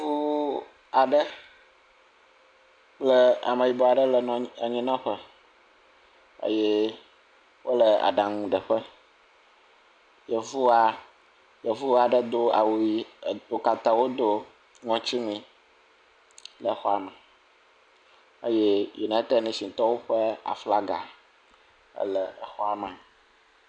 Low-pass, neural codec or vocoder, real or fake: 9.9 kHz; none; real